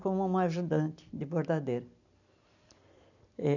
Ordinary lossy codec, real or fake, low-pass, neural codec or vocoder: none; real; 7.2 kHz; none